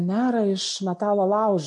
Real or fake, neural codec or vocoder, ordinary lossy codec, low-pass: real; none; MP3, 48 kbps; 10.8 kHz